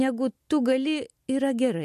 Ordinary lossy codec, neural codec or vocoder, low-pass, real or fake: MP3, 64 kbps; none; 14.4 kHz; real